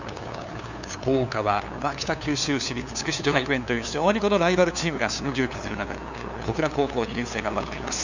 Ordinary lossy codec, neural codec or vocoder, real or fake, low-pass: none; codec, 16 kHz, 2 kbps, FunCodec, trained on LibriTTS, 25 frames a second; fake; 7.2 kHz